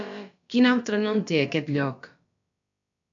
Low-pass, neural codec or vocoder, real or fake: 7.2 kHz; codec, 16 kHz, about 1 kbps, DyCAST, with the encoder's durations; fake